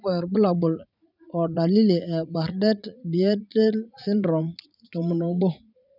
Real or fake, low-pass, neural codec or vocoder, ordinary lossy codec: fake; 5.4 kHz; vocoder, 44.1 kHz, 80 mel bands, Vocos; none